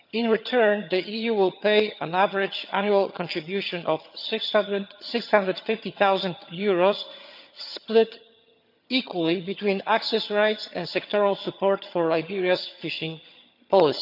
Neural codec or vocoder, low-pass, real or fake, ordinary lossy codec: vocoder, 22.05 kHz, 80 mel bands, HiFi-GAN; 5.4 kHz; fake; none